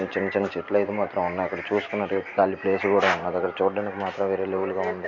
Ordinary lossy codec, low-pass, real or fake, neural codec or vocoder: none; 7.2 kHz; real; none